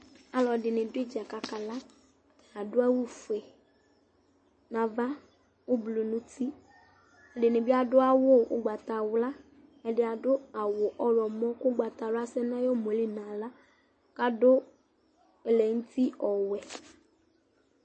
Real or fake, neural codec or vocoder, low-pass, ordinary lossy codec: real; none; 9.9 kHz; MP3, 32 kbps